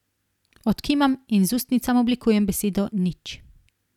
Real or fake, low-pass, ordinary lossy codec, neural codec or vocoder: real; 19.8 kHz; none; none